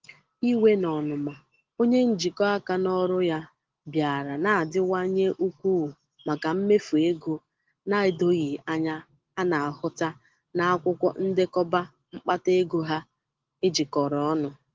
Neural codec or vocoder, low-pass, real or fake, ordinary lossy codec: none; 7.2 kHz; real; Opus, 16 kbps